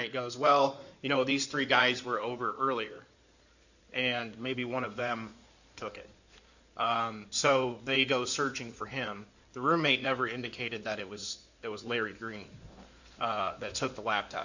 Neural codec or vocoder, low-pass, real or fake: codec, 16 kHz in and 24 kHz out, 2.2 kbps, FireRedTTS-2 codec; 7.2 kHz; fake